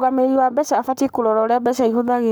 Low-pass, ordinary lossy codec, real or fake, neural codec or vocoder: none; none; fake; codec, 44.1 kHz, 7.8 kbps, Pupu-Codec